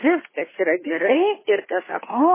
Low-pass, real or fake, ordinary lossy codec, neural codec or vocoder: 3.6 kHz; fake; MP3, 16 kbps; codec, 16 kHz, 2 kbps, FunCodec, trained on Chinese and English, 25 frames a second